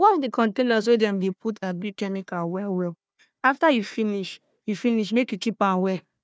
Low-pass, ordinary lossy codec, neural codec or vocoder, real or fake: none; none; codec, 16 kHz, 1 kbps, FunCodec, trained on Chinese and English, 50 frames a second; fake